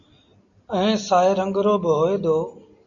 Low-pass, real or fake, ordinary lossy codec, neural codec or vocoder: 7.2 kHz; real; MP3, 96 kbps; none